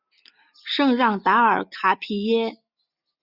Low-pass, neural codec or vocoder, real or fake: 5.4 kHz; none; real